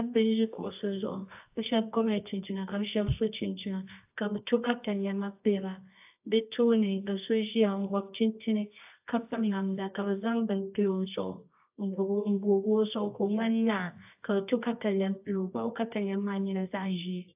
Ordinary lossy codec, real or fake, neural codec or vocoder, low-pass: AAC, 32 kbps; fake; codec, 24 kHz, 0.9 kbps, WavTokenizer, medium music audio release; 3.6 kHz